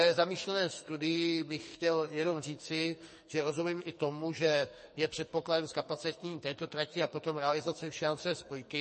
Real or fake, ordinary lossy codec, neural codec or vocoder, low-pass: fake; MP3, 32 kbps; codec, 44.1 kHz, 2.6 kbps, SNAC; 10.8 kHz